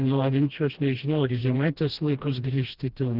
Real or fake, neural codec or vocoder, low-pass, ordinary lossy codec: fake; codec, 16 kHz, 1 kbps, FreqCodec, smaller model; 5.4 kHz; Opus, 16 kbps